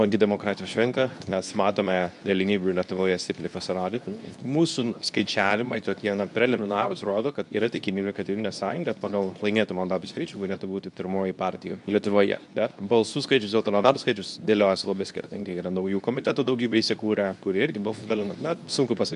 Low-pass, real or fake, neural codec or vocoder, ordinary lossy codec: 10.8 kHz; fake; codec, 24 kHz, 0.9 kbps, WavTokenizer, medium speech release version 2; MP3, 96 kbps